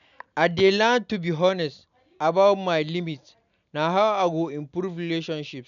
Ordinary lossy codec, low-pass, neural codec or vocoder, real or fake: none; 7.2 kHz; none; real